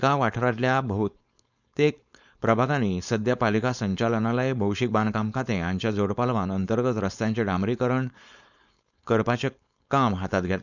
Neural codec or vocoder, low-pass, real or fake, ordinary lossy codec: codec, 16 kHz, 4.8 kbps, FACodec; 7.2 kHz; fake; none